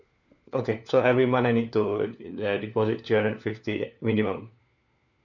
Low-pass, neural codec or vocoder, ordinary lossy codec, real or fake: 7.2 kHz; codec, 16 kHz, 4 kbps, FunCodec, trained on LibriTTS, 50 frames a second; none; fake